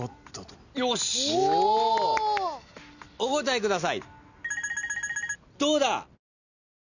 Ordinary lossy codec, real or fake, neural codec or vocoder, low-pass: none; real; none; 7.2 kHz